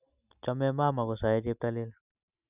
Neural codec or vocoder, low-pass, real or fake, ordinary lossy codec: none; 3.6 kHz; real; none